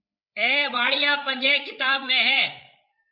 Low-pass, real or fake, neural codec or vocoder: 5.4 kHz; fake; codec, 16 kHz, 8 kbps, FreqCodec, larger model